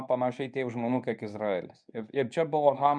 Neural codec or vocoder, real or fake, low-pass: codec, 24 kHz, 0.9 kbps, WavTokenizer, medium speech release version 1; fake; 9.9 kHz